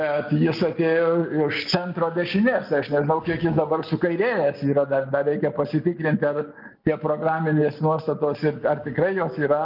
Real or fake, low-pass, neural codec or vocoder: real; 5.4 kHz; none